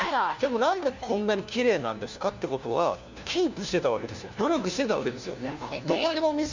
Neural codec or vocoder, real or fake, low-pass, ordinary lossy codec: codec, 16 kHz, 1 kbps, FunCodec, trained on LibriTTS, 50 frames a second; fake; 7.2 kHz; Opus, 64 kbps